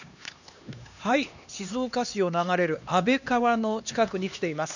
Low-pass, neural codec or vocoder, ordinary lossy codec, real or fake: 7.2 kHz; codec, 16 kHz, 2 kbps, X-Codec, HuBERT features, trained on LibriSpeech; none; fake